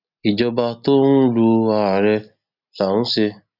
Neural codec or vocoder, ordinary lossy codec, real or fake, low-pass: none; none; real; 5.4 kHz